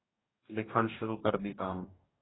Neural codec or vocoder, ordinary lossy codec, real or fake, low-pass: codec, 44.1 kHz, 2.6 kbps, DAC; AAC, 16 kbps; fake; 7.2 kHz